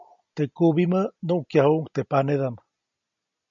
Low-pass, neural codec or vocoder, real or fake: 7.2 kHz; none; real